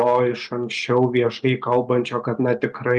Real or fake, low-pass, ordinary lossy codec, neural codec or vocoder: real; 9.9 kHz; Opus, 32 kbps; none